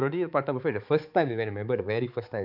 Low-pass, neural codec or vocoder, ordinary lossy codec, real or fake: 5.4 kHz; codec, 16 kHz, 4 kbps, X-Codec, HuBERT features, trained on balanced general audio; none; fake